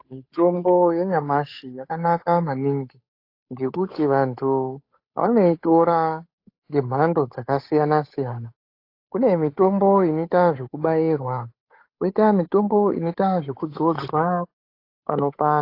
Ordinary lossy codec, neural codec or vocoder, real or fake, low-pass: AAC, 32 kbps; codec, 16 kHz, 8 kbps, FunCodec, trained on Chinese and English, 25 frames a second; fake; 5.4 kHz